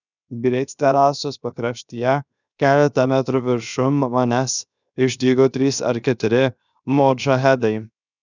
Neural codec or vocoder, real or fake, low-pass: codec, 16 kHz, 0.7 kbps, FocalCodec; fake; 7.2 kHz